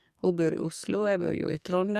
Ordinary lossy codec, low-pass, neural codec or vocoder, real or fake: Opus, 64 kbps; 14.4 kHz; codec, 44.1 kHz, 2.6 kbps, SNAC; fake